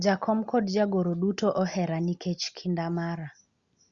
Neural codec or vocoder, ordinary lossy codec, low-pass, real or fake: none; Opus, 64 kbps; 7.2 kHz; real